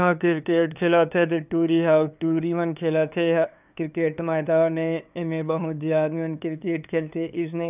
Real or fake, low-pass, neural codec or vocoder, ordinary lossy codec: fake; 3.6 kHz; codec, 16 kHz, 2 kbps, FunCodec, trained on LibriTTS, 25 frames a second; none